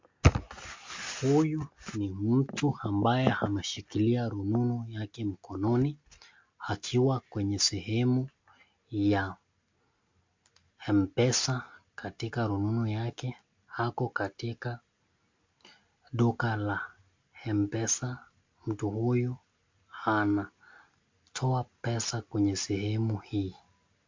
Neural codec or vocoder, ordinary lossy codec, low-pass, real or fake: none; MP3, 48 kbps; 7.2 kHz; real